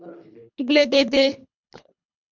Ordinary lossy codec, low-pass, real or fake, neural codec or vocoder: MP3, 64 kbps; 7.2 kHz; fake; codec, 24 kHz, 3 kbps, HILCodec